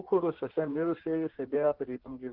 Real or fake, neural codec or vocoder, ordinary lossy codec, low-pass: fake; codec, 16 kHz in and 24 kHz out, 1.1 kbps, FireRedTTS-2 codec; Opus, 16 kbps; 5.4 kHz